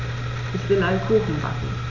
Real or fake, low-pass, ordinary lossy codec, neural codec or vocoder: real; 7.2 kHz; none; none